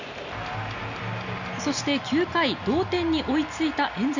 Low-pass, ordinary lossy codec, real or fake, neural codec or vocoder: 7.2 kHz; none; real; none